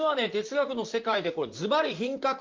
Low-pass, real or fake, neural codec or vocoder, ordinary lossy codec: 7.2 kHz; fake; vocoder, 44.1 kHz, 128 mel bands, Pupu-Vocoder; Opus, 24 kbps